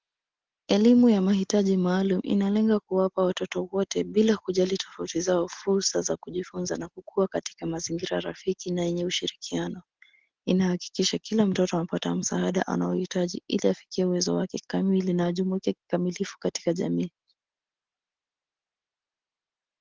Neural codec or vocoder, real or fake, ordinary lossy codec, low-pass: none; real; Opus, 16 kbps; 7.2 kHz